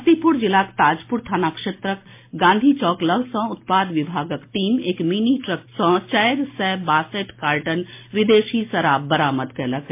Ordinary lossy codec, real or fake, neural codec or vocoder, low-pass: MP3, 24 kbps; real; none; 3.6 kHz